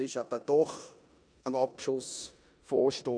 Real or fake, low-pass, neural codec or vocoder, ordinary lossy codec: fake; 9.9 kHz; codec, 16 kHz in and 24 kHz out, 0.9 kbps, LongCat-Audio-Codec, four codebook decoder; none